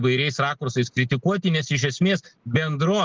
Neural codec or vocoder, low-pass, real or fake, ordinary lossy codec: none; 7.2 kHz; real; Opus, 16 kbps